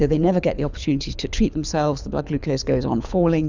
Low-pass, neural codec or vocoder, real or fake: 7.2 kHz; codec, 24 kHz, 6 kbps, HILCodec; fake